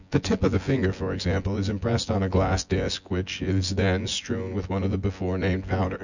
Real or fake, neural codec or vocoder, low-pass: fake; vocoder, 24 kHz, 100 mel bands, Vocos; 7.2 kHz